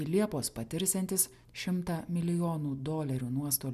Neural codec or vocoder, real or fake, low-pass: none; real; 14.4 kHz